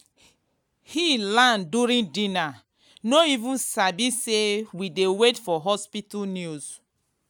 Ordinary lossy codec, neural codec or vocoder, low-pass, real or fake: none; none; none; real